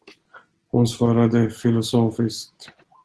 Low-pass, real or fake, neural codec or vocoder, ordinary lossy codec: 10.8 kHz; real; none; Opus, 16 kbps